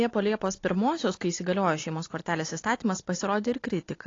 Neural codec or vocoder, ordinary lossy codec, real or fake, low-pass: none; AAC, 32 kbps; real; 7.2 kHz